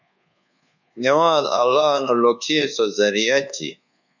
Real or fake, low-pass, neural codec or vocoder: fake; 7.2 kHz; codec, 24 kHz, 1.2 kbps, DualCodec